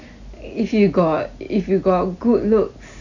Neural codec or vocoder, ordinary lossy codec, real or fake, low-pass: none; none; real; 7.2 kHz